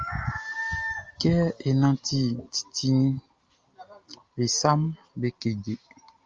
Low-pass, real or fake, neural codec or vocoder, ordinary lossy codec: 7.2 kHz; real; none; Opus, 32 kbps